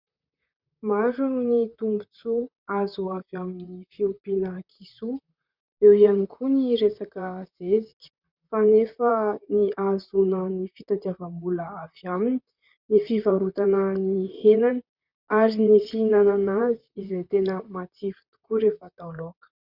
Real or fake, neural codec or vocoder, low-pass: fake; vocoder, 44.1 kHz, 128 mel bands, Pupu-Vocoder; 5.4 kHz